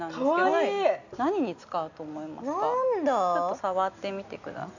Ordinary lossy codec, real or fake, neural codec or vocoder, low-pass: none; real; none; 7.2 kHz